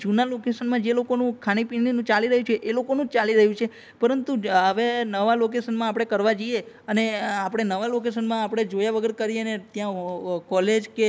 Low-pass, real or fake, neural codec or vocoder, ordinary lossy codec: none; real; none; none